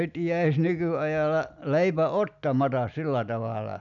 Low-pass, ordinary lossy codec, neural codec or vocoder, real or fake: 7.2 kHz; none; none; real